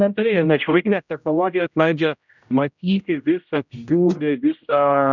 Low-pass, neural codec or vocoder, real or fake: 7.2 kHz; codec, 16 kHz, 0.5 kbps, X-Codec, HuBERT features, trained on general audio; fake